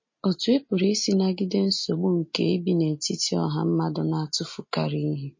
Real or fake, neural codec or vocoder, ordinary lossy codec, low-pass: real; none; MP3, 32 kbps; 7.2 kHz